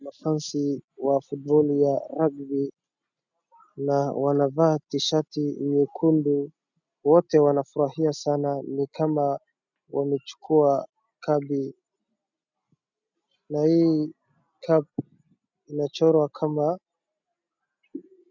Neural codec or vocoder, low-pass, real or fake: none; 7.2 kHz; real